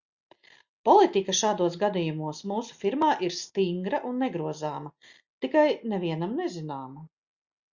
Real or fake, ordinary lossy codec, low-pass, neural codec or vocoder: real; Opus, 64 kbps; 7.2 kHz; none